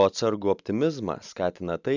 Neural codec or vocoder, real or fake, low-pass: none; real; 7.2 kHz